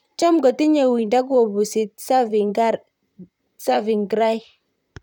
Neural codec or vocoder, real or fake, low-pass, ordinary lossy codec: vocoder, 44.1 kHz, 128 mel bands, Pupu-Vocoder; fake; 19.8 kHz; none